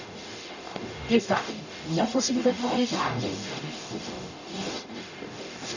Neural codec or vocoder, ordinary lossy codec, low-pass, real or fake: codec, 44.1 kHz, 0.9 kbps, DAC; none; 7.2 kHz; fake